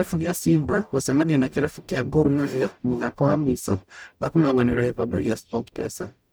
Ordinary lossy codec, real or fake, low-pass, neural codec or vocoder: none; fake; none; codec, 44.1 kHz, 0.9 kbps, DAC